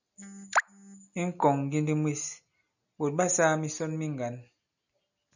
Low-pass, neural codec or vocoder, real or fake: 7.2 kHz; none; real